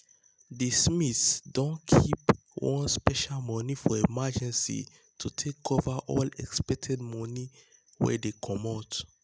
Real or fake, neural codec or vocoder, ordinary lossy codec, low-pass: real; none; none; none